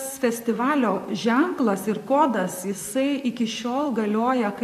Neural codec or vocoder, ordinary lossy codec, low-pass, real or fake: vocoder, 44.1 kHz, 128 mel bands, Pupu-Vocoder; AAC, 96 kbps; 14.4 kHz; fake